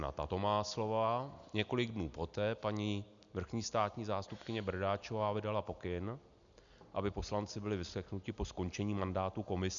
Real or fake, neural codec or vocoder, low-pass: real; none; 7.2 kHz